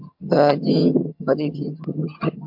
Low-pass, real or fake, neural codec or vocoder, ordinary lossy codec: 5.4 kHz; fake; vocoder, 22.05 kHz, 80 mel bands, HiFi-GAN; MP3, 48 kbps